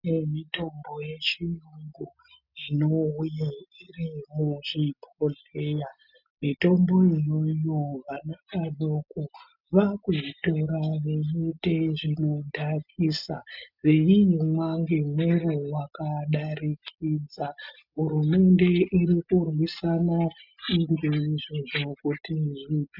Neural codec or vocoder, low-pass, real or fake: none; 5.4 kHz; real